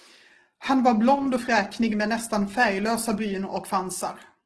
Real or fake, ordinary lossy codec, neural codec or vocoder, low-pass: real; Opus, 16 kbps; none; 10.8 kHz